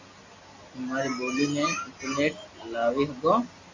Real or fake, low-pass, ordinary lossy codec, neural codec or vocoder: real; 7.2 kHz; AAC, 48 kbps; none